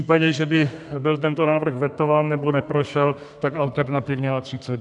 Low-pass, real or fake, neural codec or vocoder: 10.8 kHz; fake; codec, 32 kHz, 1.9 kbps, SNAC